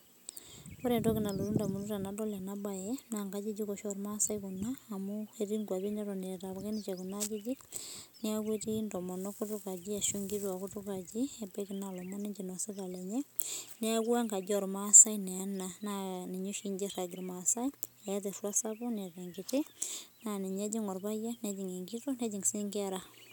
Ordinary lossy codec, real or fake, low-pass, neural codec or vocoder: none; real; none; none